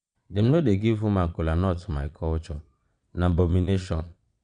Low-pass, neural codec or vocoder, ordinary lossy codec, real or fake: 9.9 kHz; vocoder, 22.05 kHz, 80 mel bands, Vocos; none; fake